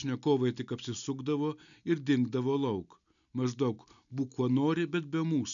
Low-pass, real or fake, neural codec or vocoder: 7.2 kHz; real; none